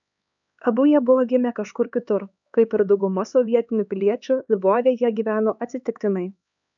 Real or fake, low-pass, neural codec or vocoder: fake; 7.2 kHz; codec, 16 kHz, 4 kbps, X-Codec, HuBERT features, trained on LibriSpeech